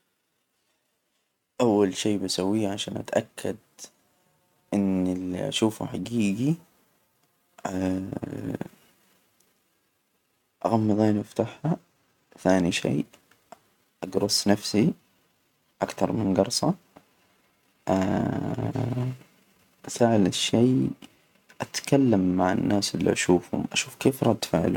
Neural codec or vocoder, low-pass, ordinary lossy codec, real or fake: none; 19.8 kHz; none; real